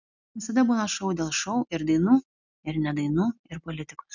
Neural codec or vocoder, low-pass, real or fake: none; 7.2 kHz; real